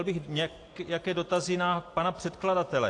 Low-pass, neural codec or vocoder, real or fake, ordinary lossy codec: 10.8 kHz; none; real; AAC, 48 kbps